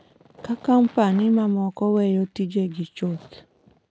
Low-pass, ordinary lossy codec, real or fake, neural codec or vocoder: none; none; real; none